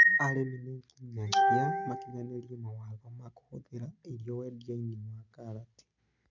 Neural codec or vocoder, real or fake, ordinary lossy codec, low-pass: none; real; none; 7.2 kHz